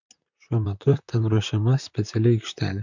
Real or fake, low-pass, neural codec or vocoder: fake; 7.2 kHz; vocoder, 44.1 kHz, 128 mel bands, Pupu-Vocoder